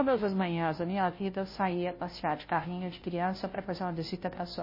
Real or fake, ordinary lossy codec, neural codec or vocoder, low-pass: fake; MP3, 24 kbps; codec, 16 kHz, 0.5 kbps, FunCodec, trained on Chinese and English, 25 frames a second; 5.4 kHz